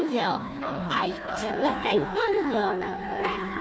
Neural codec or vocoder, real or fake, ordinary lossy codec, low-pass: codec, 16 kHz, 1 kbps, FunCodec, trained on Chinese and English, 50 frames a second; fake; none; none